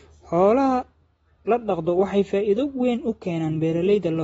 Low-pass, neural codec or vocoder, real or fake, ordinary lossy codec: 14.4 kHz; none; real; AAC, 24 kbps